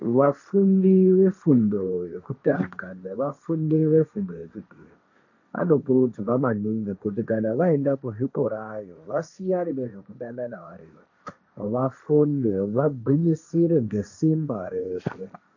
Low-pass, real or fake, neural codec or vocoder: 7.2 kHz; fake; codec, 16 kHz, 1.1 kbps, Voila-Tokenizer